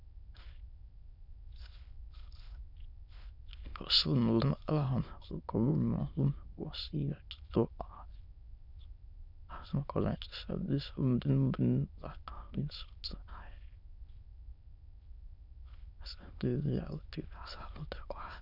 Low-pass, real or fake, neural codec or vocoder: 5.4 kHz; fake; autoencoder, 22.05 kHz, a latent of 192 numbers a frame, VITS, trained on many speakers